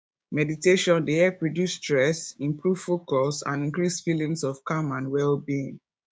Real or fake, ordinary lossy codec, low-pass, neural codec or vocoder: fake; none; none; codec, 16 kHz, 6 kbps, DAC